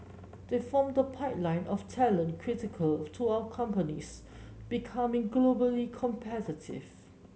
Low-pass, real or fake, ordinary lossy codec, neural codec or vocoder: none; real; none; none